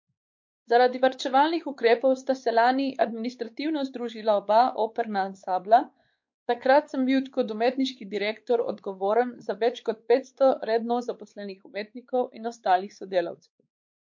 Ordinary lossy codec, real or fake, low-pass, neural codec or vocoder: MP3, 48 kbps; fake; 7.2 kHz; codec, 16 kHz, 4 kbps, X-Codec, WavLM features, trained on Multilingual LibriSpeech